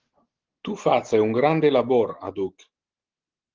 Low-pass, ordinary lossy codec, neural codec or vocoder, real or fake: 7.2 kHz; Opus, 16 kbps; none; real